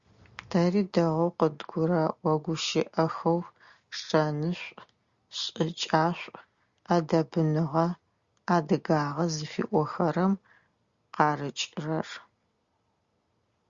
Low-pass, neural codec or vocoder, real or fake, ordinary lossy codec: 7.2 kHz; none; real; Opus, 64 kbps